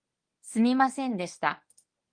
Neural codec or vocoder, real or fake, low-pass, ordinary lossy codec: codec, 24 kHz, 0.9 kbps, WavTokenizer, medium speech release version 1; fake; 9.9 kHz; Opus, 24 kbps